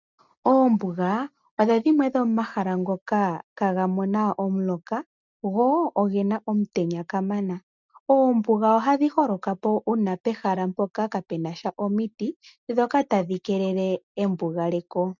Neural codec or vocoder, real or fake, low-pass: none; real; 7.2 kHz